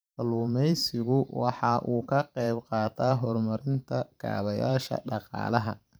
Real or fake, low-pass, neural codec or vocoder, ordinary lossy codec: fake; none; vocoder, 44.1 kHz, 128 mel bands every 512 samples, BigVGAN v2; none